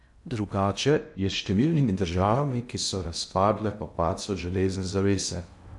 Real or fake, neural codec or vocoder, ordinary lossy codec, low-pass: fake; codec, 16 kHz in and 24 kHz out, 0.6 kbps, FocalCodec, streaming, 2048 codes; none; 10.8 kHz